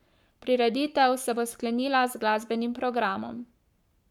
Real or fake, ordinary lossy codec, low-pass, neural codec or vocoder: fake; none; 19.8 kHz; codec, 44.1 kHz, 7.8 kbps, Pupu-Codec